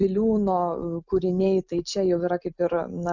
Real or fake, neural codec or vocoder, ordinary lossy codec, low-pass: real; none; Opus, 64 kbps; 7.2 kHz